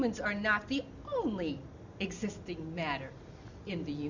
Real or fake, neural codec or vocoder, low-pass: real; none; 7.2 kHz